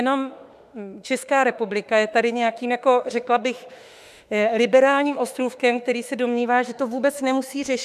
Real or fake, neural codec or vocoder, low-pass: fake; autoencoder, 48 kHz, 32 numbers a frame, DAC-VAE, trained on Japanese speech; 14.4 kHz